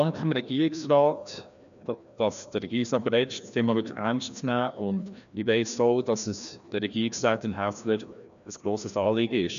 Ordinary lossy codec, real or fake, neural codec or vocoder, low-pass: none; fake; codec, 16 kHz, 1 kbps, FreqCodec, larger model; 7.2 kHz